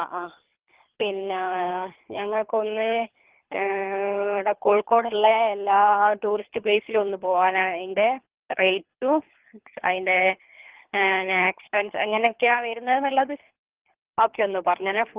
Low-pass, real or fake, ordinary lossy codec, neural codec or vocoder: 3.6 kHz; fake; Opus, 16 kbps; codec, 24 kHz, 3 kbps, HILCodec